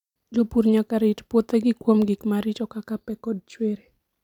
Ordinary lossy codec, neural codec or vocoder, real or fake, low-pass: none; vocoder, 44.1 kHz, 128 mel bands every 256 samples, BigVGAN v2; fake; 19.8 kHz